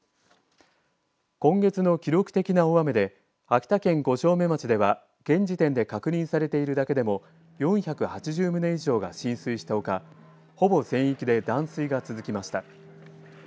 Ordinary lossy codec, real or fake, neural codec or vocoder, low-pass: none; real; none; none